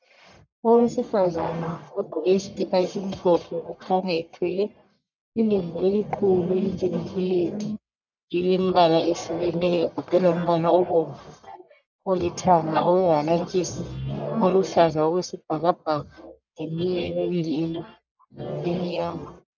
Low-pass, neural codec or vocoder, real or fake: 7.2 kHz; codec, 44.1 kHz, 1.7 kbps, Pupu-Codec; fake